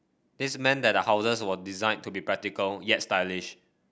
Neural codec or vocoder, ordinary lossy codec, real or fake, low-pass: none; none; real; none